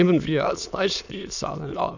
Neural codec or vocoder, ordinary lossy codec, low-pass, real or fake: autoencoder, 22.05 kHz, a latent of 192 numbers a frame, VITS, trained on many speakers; none; 7.2 kHz; fake